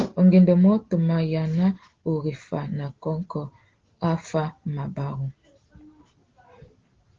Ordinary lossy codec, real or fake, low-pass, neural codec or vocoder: Opus, 16 kbps; real; 7.2 kHz; none